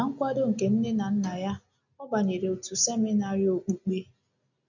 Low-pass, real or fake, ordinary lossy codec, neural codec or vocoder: 7.2 kHz; real; none; none